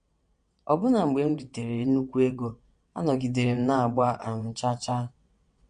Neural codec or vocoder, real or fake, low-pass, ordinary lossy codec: vocoder, 22.05 kHz, 80 mel bands, WaveNeXt; fake; 9.9 kHz; MP3, 48 kbps